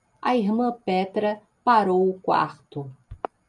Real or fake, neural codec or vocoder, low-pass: real; none; 10.8 kHz